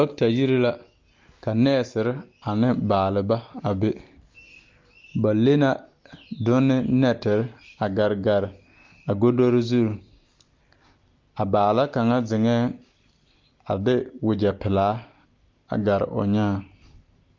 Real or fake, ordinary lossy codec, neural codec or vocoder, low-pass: real; Opus, 32 kbps; none; 7.2 kHz